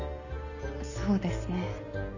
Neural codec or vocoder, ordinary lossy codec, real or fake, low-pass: none; none; real; 7.2 kHz